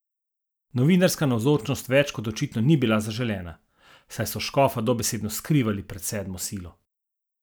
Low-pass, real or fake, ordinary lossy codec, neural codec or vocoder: none; real; none; none